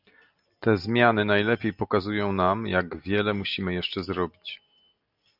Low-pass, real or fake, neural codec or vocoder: 5.4 kHz; real; none